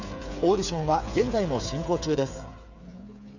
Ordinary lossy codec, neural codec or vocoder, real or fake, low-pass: none; codec, 16 kHz, 8 kbps, FreqCodec, smaller model; fake; 7.2 kHz